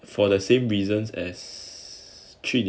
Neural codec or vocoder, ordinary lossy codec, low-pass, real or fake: none; none; none; real